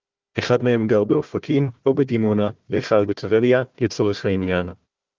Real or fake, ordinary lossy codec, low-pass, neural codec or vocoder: fake; Opus, 32 kbps; 7.2 kHz; codec, 16 kHz, 1 kbps, FunCodec, trained on Chinese and English, 50 frames a second